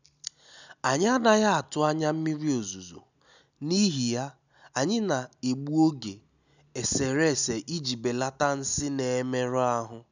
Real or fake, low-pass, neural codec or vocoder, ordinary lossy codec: real; 7.2 kHz; none; none